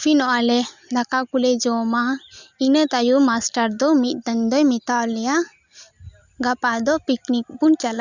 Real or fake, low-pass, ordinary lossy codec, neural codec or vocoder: real; 7.2 kHz; Opus, 64 kbps; none